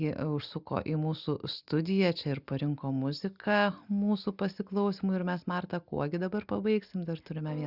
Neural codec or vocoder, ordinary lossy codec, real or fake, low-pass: none; Opus, 64 kbps; real; 5.4 kHz